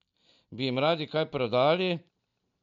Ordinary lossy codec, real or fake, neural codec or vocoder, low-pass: MP3, 96 kbps; real; none; 7.2 kHz